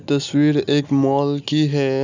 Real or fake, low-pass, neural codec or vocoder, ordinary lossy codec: real; 7.2 kHz; none; none